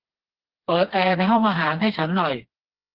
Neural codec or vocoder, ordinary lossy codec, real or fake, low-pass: codec, 16 kHz, 2 kbps, FreqCodec, smaller model; Opus, 16 kbps; fake; 5.4 kHz